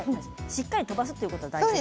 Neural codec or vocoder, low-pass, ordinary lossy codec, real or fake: none; none; none; real